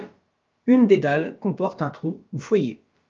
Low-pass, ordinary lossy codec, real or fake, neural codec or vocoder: 7.2 kHz; Opus, 24 kbps; fake; codec, 16 kHz, about 1 kbps, DyCAST, with the encoder's durations